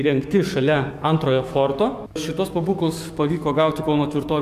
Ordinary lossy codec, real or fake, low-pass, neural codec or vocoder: AAC, 96 kbps; fake; 14.4 kHz; codec, 44.1 kHz, 7.8 kbps, DAC